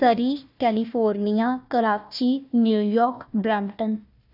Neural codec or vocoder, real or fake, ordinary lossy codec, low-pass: codec, 16 kHz, 1 kbps, FunCodec, trained on Chinese and English, 50 frames a second; fake; none; 5.4 kHz